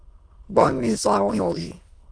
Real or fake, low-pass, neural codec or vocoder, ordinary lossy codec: fake; 9.9 kHz; autoencoder, 22.05 kHz, a latent of 192 numbers a frame, VITS, trained on many speakers; Opus, 32 kbps